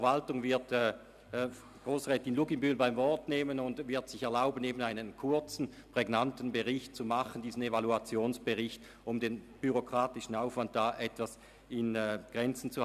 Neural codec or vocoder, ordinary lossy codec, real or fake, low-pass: none; none; real; 14.4 kHz